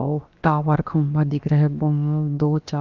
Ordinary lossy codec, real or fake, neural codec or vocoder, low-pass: Opus, 32 kbps; fake; codec, 16 kHz, about 1 kbps, DyCAST, with the encoder's durations; 7.2 kHz